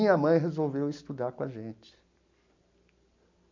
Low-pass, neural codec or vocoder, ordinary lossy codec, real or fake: 7.2 kHz; none; MP3, 64 kbps; real